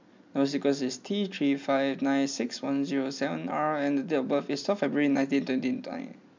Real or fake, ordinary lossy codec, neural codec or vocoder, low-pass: real; MP3, 64 kbps; none; 7.2 kHz